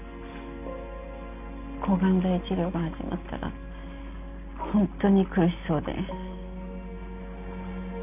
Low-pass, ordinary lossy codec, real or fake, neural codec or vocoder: 3.6 kHz; none; real; none